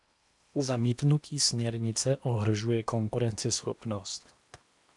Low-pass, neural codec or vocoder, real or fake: 10.8 kHz; codec, 16 kHz in and 24 kHz out, 0.8 kbps, FocalCodec, streaming, 65536 codes; fake